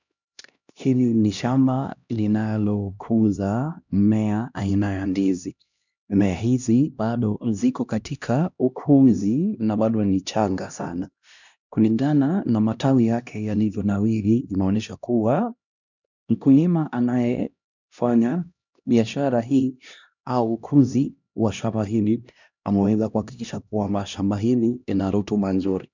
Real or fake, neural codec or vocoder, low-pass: fake; codec, 16 kHz, 1 kbps, X-Codec, HuBERT features, trained on LibriSpeech; 7.2 kHz